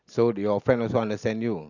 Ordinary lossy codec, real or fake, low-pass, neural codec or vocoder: none; real; 7.2 kHz; none